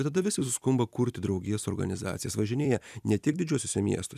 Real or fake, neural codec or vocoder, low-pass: fake; vocoder, 44.1 kHz, 128 mel bands every 256 samples, BigVGAN v2; 14.4 kHz